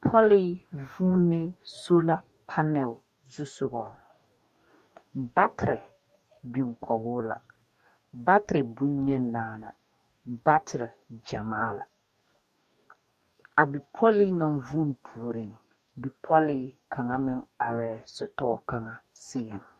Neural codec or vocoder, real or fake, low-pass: codec, 44.1 kHz, 2.6 kbps, DAC; fake; 14.4 kHz